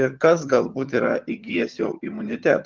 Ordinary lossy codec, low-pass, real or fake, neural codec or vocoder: Opus, 32 kbps; 7.2 kHz; fake; vocoder, 22.05 kHz, 80 mel bands, HiFi-GAN